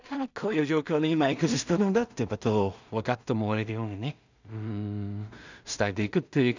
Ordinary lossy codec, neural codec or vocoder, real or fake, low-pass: none; codec, 16 kHz in and 24 kHz out, 0.4 kbps, LongCat-Audio-Codec, two codebook decoder; fake; 7.2 kHz